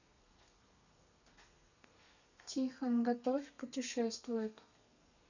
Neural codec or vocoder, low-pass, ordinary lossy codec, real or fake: codec, 32 kHz, 1.9 kbps, SNAC; 7.2 kHz; none; fake